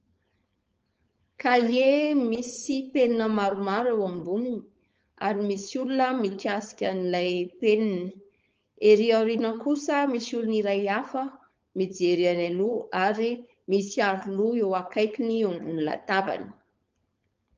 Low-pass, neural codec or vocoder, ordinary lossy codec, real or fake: 7.2 kHz; codec, 16 kHz, 4.8 kbps, FACodec; Opus, 24 kbps; fake